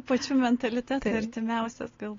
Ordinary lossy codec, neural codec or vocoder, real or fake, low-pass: AAC, 32 kbps; none; real; 7.2 kHz